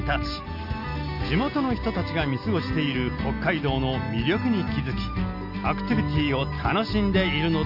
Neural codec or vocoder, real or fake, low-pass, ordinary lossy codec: none; real; 5.4 kHz; none